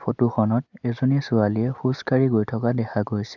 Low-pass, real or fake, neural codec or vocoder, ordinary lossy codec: 7.2 kHz; real; none; none